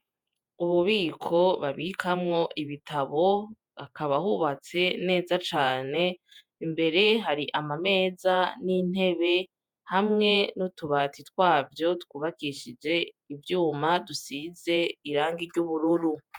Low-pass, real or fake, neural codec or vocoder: 19.8 kHz; fake; vocoder, 48 kHz, 128 mel bands, Vocos